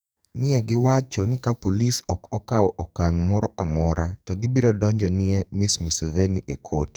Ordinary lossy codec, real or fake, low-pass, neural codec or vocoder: none; fake; none; codec, 44.1 kHz, 2.6 kbps, SNAC